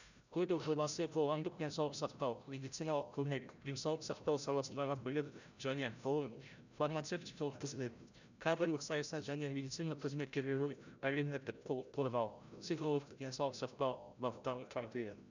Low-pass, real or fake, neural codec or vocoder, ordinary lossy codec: 7.2 kHz; fake; codec, 16 kHz, 0.5 kbps, FreqCodec, larger model; none